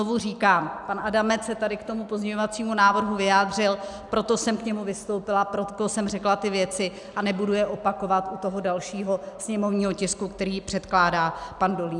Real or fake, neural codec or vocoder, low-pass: real; none; 10.8 kHz